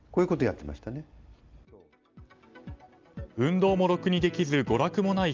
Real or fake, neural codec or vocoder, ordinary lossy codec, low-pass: real; none; Opus, 32 kbps; 7.2 kHz